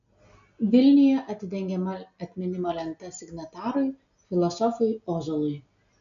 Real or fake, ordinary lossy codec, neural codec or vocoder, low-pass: real; MP3, 64 kbps; none; 7.2 kHz